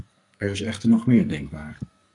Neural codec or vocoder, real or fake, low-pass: codec, 44.1 kHz, 2.6 kbps, SNAC; fake; 10.8 kHz